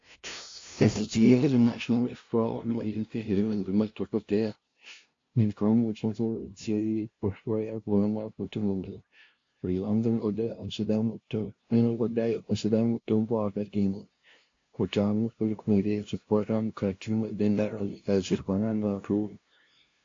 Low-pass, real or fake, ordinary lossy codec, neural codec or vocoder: 7.2 kHz; fake; AAC, 48 kbps; codec, 16 kHz, 0.5 kbps, FunCodec, trained on LibriTTS, 25 frames a second